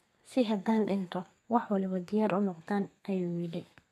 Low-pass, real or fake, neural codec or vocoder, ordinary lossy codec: 14.4 kHz; fake; codec, 32 kHz, 1.9 kbps, SNAC; MP3, 96 kbps